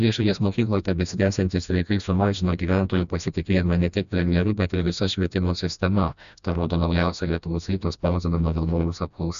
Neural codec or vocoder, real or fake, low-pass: codec, 16 kHz, 1 kbps, FreqCodec, smaller model; fake; 7.2 kHz